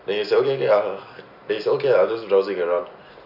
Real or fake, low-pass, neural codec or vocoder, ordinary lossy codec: real; 5.4 kHz; none; none